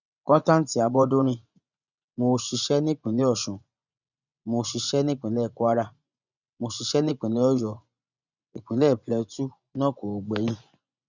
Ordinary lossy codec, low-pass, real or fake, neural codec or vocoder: none; 7.2 kHz; fake; vocoder, 24 kHz, 100 mel bands, Vocos